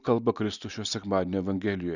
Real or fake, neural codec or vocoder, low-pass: real; none; 7.2 kHz